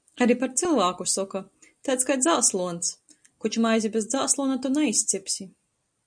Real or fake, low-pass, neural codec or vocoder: real; 9.9 kHz; none